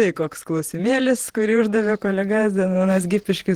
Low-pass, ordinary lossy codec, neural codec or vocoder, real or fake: 19.8 kHz; Opus, 16 kbps; vocoder, 48 kHz, 128 mel bands, Vocos; fake